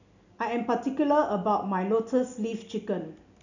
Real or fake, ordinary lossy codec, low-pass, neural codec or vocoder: real; none; 7.2 kHz; none